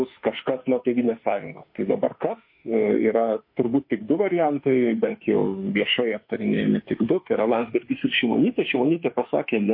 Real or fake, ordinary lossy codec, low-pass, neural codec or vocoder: fake; MP3, 32 kbps; 5.4 kHz; autoencoder, 48 kHz, 32 numbers a frame, DAC-VAE, trained on Japanese speech